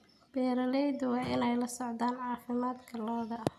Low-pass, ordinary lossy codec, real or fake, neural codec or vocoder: 14.4 kHz; none; real; none